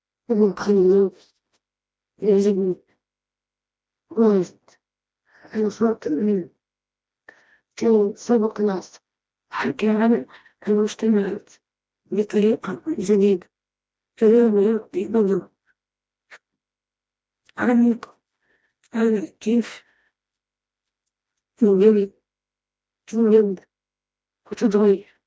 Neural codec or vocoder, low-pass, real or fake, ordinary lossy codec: codec, 16 kHz, 1 kbps, FreqCodec, smaller model; none; fake; none